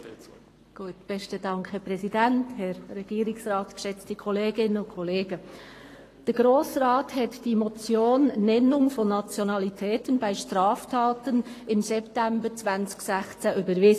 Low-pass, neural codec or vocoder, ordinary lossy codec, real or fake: 14.4 kHz; codec, 44.1 kHz, 7.8 kbps, Pupu-Codec; AAC, 48 kbps; fake